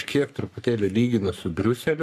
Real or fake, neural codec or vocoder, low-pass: fake; codec, 44.1 kHz, 3.4 kbps, Pupu-Codec; 14.4 kHz